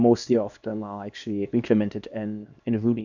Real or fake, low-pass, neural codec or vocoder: fake; 7.2 kHz; codec, 24 kHz, 0.9 kbps, WavTokenizer, small release